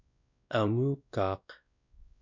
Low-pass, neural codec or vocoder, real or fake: 7.2 kHz; codec, 16 kHz, 1 kbps, X-Codec, WavLM features, trained on Multilingual LibriSpeech; fake